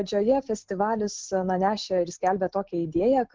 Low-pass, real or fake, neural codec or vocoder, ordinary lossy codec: 7.2 kHz; real; none; Opus, 16 kbps